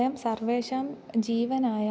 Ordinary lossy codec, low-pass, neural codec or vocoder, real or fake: none; none; none; real